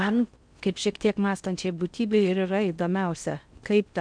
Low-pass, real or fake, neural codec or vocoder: 9.9 kHz; fake; codec, 16 kHz in and 24 kHz out, 0.6 kbps, FocalCodec, streaming, 4096 codes